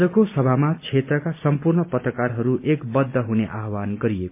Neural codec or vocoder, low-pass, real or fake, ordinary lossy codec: none; 3.6 kHz; real; MP3, 32 kbps